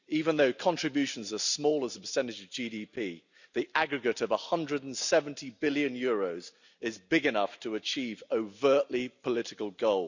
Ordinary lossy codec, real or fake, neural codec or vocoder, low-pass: none; real; none; 7.2 kHz